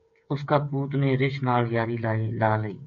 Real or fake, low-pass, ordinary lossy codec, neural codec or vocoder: fake; 7.2 kHz; MP3, 64 kbps; codec, 16 kHz, 8 kbps, FreqCodec, smaller model